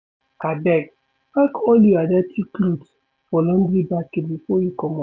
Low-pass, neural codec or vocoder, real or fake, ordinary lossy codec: none; none; real; none